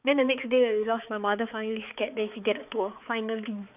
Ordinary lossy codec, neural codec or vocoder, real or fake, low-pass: none; codec, 16 kHz, 4 kbps, X-Codec, HuBERT features, trained on balanced general audio; fake; 3.6 kHz